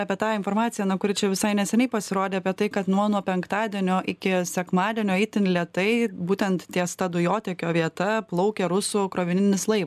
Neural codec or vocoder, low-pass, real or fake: none; 14.4 kHz; real